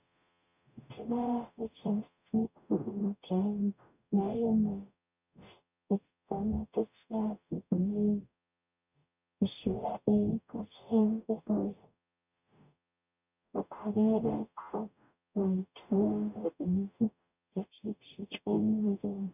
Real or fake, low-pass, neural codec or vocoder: fake; 3.6 kHz; codec, 44.1 kHz, 0.9 kbps, DAC